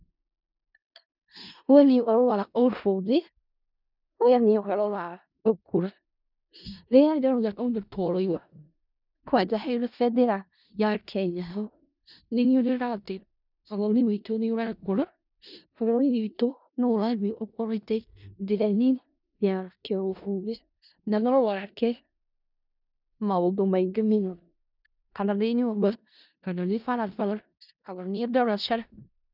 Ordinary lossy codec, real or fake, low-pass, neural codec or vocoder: none; fake; 5.4 kHz; codec, 16 kHz in and 24 kHz out, 0.4 kbps, LongCat-Audio-Codec, four codebook decoder